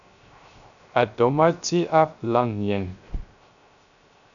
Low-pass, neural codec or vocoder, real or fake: 7.2 kHz; codec, 16 kHz, 0.3 kbps, FocalCodec; fake